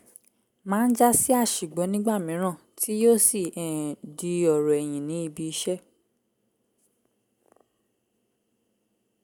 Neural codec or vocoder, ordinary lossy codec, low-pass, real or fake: none; none; none; real